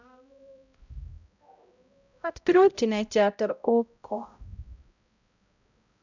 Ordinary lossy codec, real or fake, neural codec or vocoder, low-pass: none; fake; codec, 16 kHz, 0.5 kbps, X-Codec, HuBERT features, trained on balanced general audio; 7.2 kHz